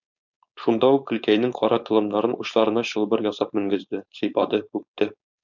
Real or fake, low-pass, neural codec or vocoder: fake; 7.2 kHz; codec, 16 kHz, 4.8 kbps, FACodec